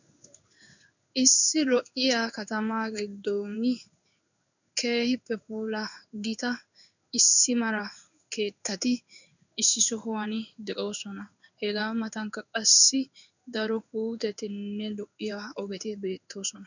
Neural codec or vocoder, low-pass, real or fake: codec, 16 kHz in and 24 kHz out, 1 kbps, XY-Tokenizer; 7.2 kHz; fake